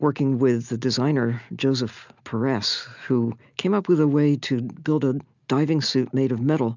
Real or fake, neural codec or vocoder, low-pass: real; none; 7.2 kHz